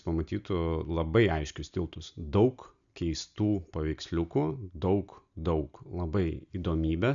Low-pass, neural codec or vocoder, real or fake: 7.2 kHz; none; real